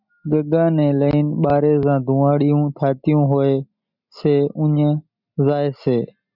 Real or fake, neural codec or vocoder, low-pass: real; none; 5.4 kHz